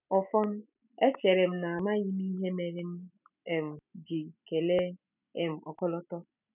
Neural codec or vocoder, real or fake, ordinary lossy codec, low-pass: none; real; none; 3.6 kHz